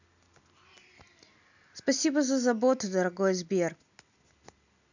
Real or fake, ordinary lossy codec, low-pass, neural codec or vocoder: real; none; 7.2 kHz; none